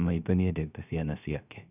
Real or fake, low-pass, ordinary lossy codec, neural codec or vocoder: fake; 3.6 kHz; none; codec, 16 kHz, 0.3 kbps, FocalCodec